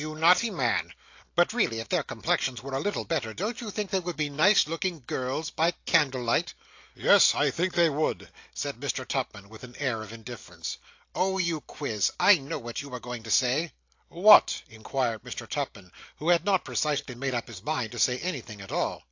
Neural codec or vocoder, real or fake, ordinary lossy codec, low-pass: none; real; AAC, 48 kbps; 7.2 kHz